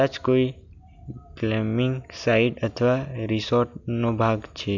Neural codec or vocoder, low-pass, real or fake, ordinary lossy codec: none; 7.2 kHz; real; none